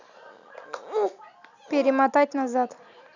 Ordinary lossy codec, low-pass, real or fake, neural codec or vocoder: none; 7.2 kHz; real; none